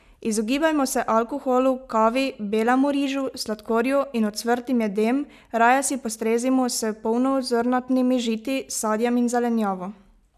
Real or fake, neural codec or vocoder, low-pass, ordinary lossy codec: real; none; 14.4 kHz; none